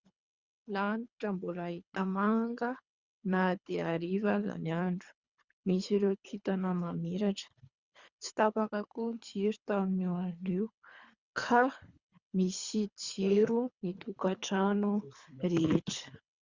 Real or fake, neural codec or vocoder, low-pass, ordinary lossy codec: fake; codec, 24 kHz, 3 kbps, HILCodec; 7.2 kHz; Opus, 64 kbps